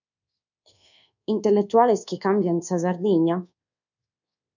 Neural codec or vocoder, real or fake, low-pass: codec, 24 kHz, 1.2 kbps, DualCodec; fake; 7.2 kHz